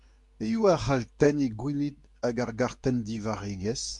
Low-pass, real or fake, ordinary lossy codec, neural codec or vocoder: 10.8 kHz; fake; MP3, 64 kbps; codec, 24 kHz, 0.9 kbps, WavTokenizer, medium speech release version 2